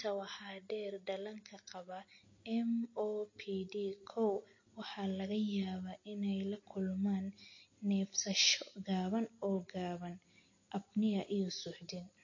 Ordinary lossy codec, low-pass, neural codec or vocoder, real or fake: MP3, 32 kbps; 7.2 kHz; none; real